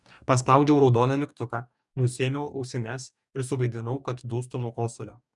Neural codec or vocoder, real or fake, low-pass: codec, 44.1 kHz, 2.6 kbps, DAC; fake; 10.8 kHz